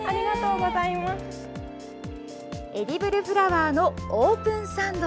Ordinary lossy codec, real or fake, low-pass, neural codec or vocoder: none; real; none; none